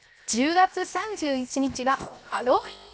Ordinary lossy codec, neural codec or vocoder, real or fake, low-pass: none; codec, 16 kHz, 0.7 kbps, FocalCodec; fake; none